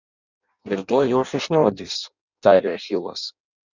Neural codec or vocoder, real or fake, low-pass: codec, 16 kHz in and 24 kHz out, 0.6 kbps, FireRedTTS-2 codec; fake; 7.2 kHz